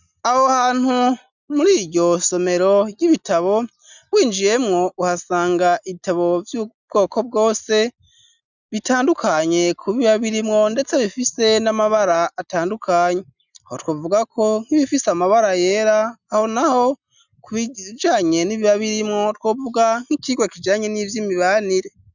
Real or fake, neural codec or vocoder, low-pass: real; none; 7.2 kHz